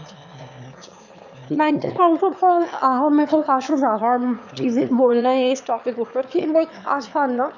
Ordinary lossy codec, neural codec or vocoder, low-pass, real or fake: none; autoencoder, 22.05 kHz, a latent of 192 numbers a frame, VITS, trained on one speaker; 7.2 kHz; fake